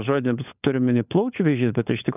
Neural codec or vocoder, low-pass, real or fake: codec, 16 kHz, 16 kbps, FunCodec, trained on LibriTTS, 50 frames a second; 3.6 kHz; fake